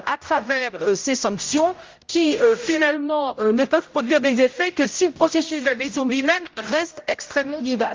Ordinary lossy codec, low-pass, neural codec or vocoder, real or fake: Opus, 32 kbps; 7.2 kHz; codec, 16 kHz, 0.5 kbps, X-Codec, HuBERT features, trained on general audio; fake